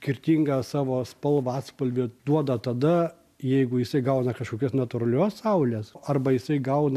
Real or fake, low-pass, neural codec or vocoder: real; 14.4 kHz; none